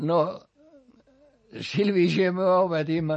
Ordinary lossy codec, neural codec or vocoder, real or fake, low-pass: MP3, 32 kbps; none; real; 9.9 kHz